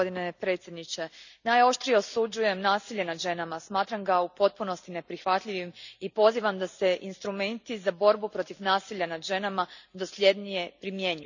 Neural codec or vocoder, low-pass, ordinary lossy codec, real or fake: none; 7.2 kHz; none; real